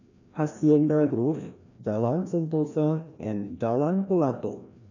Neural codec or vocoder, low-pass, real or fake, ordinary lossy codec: codec, 16 kHz, 1 kbps, FreqCodec, larger model; 7.2 kHz; fake; none